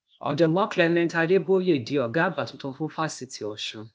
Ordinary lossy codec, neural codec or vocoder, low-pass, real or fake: none; codec, 16 kHz, 0.8 kbps, ZipCodec; none; fake